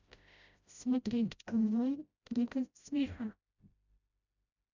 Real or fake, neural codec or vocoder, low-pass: fake; codec, 16 kHz, 0.5 kbps, FreqCodec, smaller model; 7.2 kHz